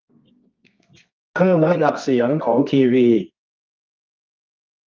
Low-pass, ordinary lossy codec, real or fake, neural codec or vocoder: 7.2 kHz; Opus, 32 kbps; fake; codec, 24 kHz, 0.9 kbps, WavTokenizer, medium music audio release